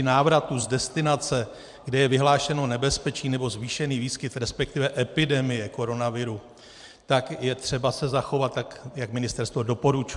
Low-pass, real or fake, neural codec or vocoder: 10.8 kHz; fake; vocoder, 44.1 kHz, 128 mel bands every 512 samples, BigVGAN v2